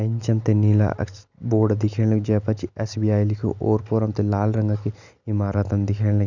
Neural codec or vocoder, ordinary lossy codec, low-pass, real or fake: none; none; 7.2 kHz; real